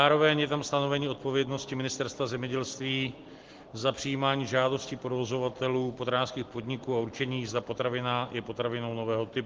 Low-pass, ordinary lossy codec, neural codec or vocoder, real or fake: 7.2 kHz; Opus, 16 kbps; none; real